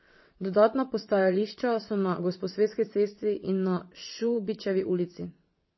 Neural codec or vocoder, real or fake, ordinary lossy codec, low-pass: vocoder, 22.05 kHz, 80 mel bands, WaveNeXt; fake; MP3, 24 kbps; 7.2 kHz